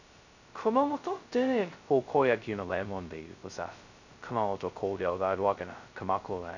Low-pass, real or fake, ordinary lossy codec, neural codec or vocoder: 7.2 kHz; fake; none; codec, 16 kHz, 0.2 kbps, FocalCodec